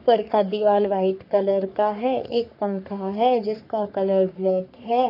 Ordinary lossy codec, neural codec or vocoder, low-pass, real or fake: none; codec, 44.1 kHz, 3.4 kbps, Pupu-Codec; 5.4 kHz; fake